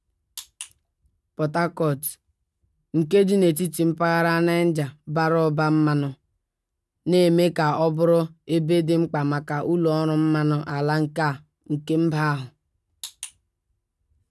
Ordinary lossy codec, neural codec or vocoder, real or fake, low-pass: none; none; real; none